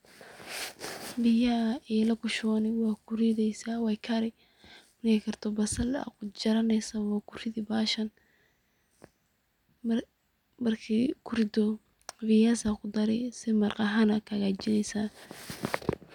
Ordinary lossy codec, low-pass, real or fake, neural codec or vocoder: none; 19.8 kHz; real; none